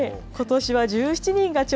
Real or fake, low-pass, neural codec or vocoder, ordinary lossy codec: real; none; none; none